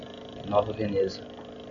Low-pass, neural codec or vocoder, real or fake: 7.2 kHz; none; real